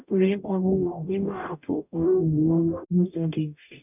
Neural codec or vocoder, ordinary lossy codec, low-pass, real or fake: codec, 44.1 kHz, 0.9 kbps, DAC; none; 3.6 kHz; fake